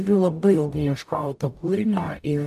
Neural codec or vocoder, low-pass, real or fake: codec, 44.1 kHz, 0.9 kbps, DAC; 14.4 kHz; fake